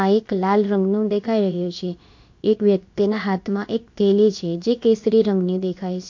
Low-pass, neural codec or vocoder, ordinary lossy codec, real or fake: 7.2 kHz; codec, 16 kHz, about 1 kbps, DyCAST, with the encoder's durations; MP3, 48 kbps; fake